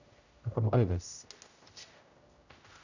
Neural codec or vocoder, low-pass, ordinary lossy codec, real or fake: codec, 16 kHz, 0.5 kbps, X-Codec, HuBERT features, trained on general audio; 7.2 kHz; none; fake